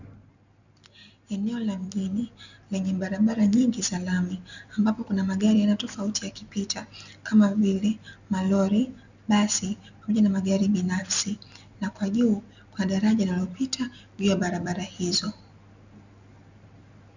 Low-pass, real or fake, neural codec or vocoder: 7.2 kHz; real; none